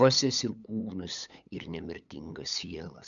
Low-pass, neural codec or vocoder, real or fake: 7.2 kHz; codec, 16 kHz, 8 kbps, FunCodec, trained on LibriTTS, 25 frames a second; fake